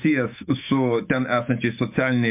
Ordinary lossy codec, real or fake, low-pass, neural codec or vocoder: MP3, 32 kbps; fake; 3.6 kHz; autoencoder, 48 kHz, 128 numbers a frame, DAC-VAE, trained on Japanese speech